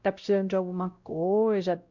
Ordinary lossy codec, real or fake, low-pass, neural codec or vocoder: Opus, 64 kbps; fake; 7.2 kHz; codec, 16 kHz, 0.5 kbps, X-Codec, WavLM features, trained on Multilingual LibriSpeech